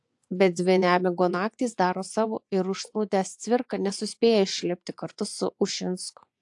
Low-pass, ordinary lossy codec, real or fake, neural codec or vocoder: 10.8 kHz; AAC, 64 kbps; fake; vocoder, 24 kHz, 100 mel bands, Vocos